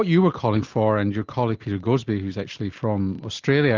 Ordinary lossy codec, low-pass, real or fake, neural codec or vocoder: Opus, 24 kbps; 7.2 kHz; real; none